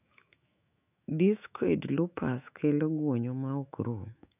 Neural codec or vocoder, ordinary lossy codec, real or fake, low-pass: autoencoder, 48 kHz, 128 numbers a frame, DAC-VAE, trained on Japanese speech; none; fake; 3.6 kHz